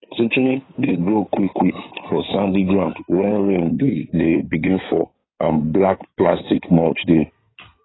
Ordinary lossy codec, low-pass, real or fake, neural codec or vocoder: AAC, 16 kbps; 7.2 kHz; fake; codec, 16 kHz in and 24 kHz out, 2.2 kbps, FireRedTTS-2 codec